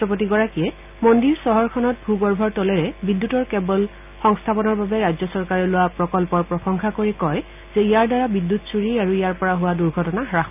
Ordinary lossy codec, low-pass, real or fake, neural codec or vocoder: none; 3.6 kHz; real; none